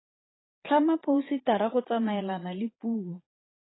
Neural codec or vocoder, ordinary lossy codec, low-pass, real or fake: vocoder, 22.05 kHz, 80 mel bands, Vocos; AAC, 16 kbps; 7.2 kHz; fake